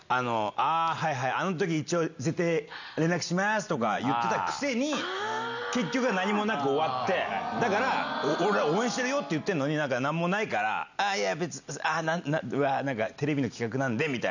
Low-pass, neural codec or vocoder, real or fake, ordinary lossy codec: 7.2 kHz; none; real; none